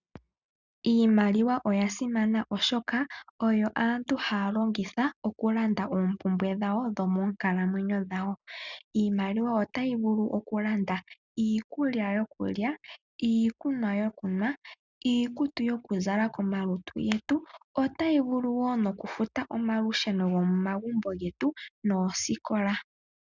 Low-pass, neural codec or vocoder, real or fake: 7.2 kHz; none; real